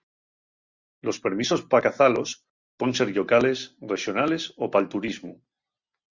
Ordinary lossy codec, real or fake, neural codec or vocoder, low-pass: Opus, 64 kbps; real; none; 7.2 kHz